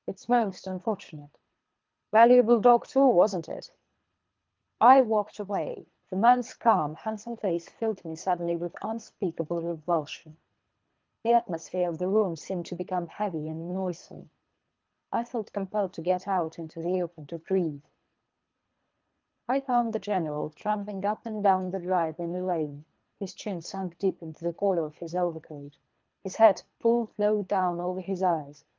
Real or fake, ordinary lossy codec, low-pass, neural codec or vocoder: fake; Opus, 32 kbps; 7.2 kHz; codec, 24 kHz, 3 kbps, HILCodec